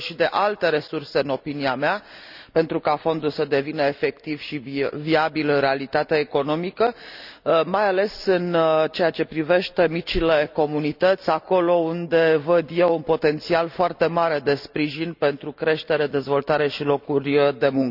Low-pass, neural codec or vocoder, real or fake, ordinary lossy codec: 5.4 kHz; none; real; none